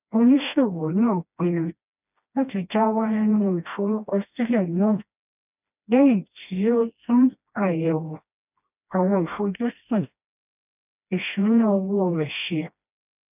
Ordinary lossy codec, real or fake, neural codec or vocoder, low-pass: none; fake; codec, 16 kHz, 1 kbps, FreqCodec, smaller model; 3.6 kHz